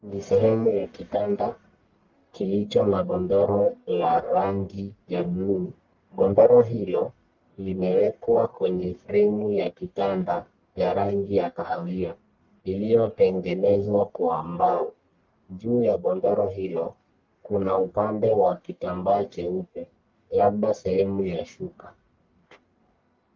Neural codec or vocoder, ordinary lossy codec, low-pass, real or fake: codec, 44.1 kHz, 1.7 kbps, Pupu-Codec; Opus, 24 kbps; 7.2 kHz; fake